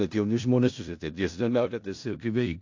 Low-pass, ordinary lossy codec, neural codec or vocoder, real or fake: 7.2 kHz; AAC, 48 kbps; codec, 16 kHz in and 24 kHz out, 0.4 kbps, LongCat-Audio-Codec, four codebook decoder; fake